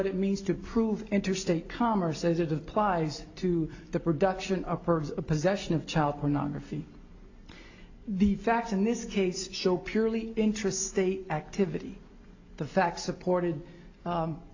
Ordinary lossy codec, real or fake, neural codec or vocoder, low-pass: AAC, 48 kbps; real; none; 7.2 kHz